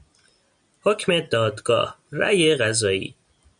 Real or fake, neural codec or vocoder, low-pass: real; none; 9.9 kHz